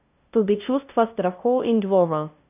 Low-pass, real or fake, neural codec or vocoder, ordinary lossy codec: 3.6 kHz; fake; codec, 16 kHz, 0.5 kbps, FunCodec, trained on LibriTTS, 25 frames a second; none